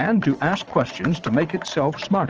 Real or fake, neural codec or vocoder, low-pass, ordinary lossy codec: fake; vocoder, 22.05 kHz, 80 mel bands, WaveNeXt; 7.2 kHz; Opus, 24 kbps